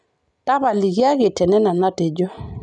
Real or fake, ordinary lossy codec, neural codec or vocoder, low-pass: real; none; none; 10.8 kHz